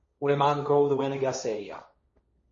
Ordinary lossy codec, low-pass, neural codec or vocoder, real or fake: MP3, 32 kbps; 7.2 kHz; codec, 16 kHz, 1.1 kbps, Voila-Tokenizer; fake